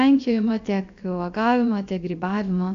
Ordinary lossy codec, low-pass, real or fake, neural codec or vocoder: AAC, 64 kbps; 7.2 kHz; fake; codec, 16 kHz, about 1 kbps, DyCAST, with the encoder's durations